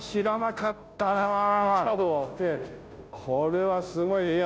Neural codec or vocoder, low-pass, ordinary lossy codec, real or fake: codec, 16 kHz, 0.5 kbps, FunCodec, trained on Chinese and English, 25 frames a second; none; none; fake